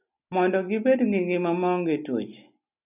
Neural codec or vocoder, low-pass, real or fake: none; 3.6 kHz; real